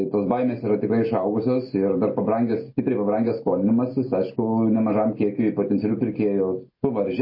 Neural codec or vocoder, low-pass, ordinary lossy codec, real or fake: none; 5.4 kHz; MP3, 24 kbps; real